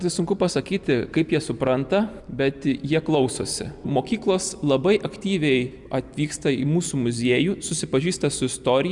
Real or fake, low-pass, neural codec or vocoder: real; 10.8 kHz; none